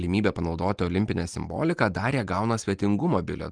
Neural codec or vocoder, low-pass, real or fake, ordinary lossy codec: none; 9.9 kHz; real; Opus, 32 kbps